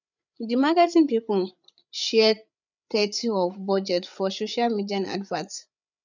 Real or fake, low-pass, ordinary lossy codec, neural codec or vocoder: fake; 7.2 kHz; none; codec, 16 kHz, 8 kbps, FreqCodec, larger model